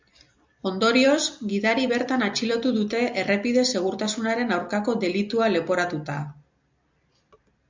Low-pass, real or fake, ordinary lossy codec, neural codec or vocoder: 7.2 kHz; real; MP3, 64 kbps; none